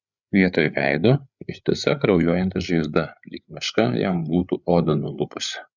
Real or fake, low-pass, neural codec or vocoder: fake; 7.2 kHz; codec, 16 kHz, 8 kbps, FreqCodec, larger model